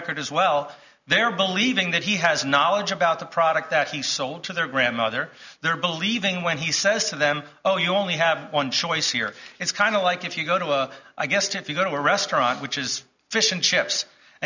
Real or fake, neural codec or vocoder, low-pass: real; none; 7.2 kHz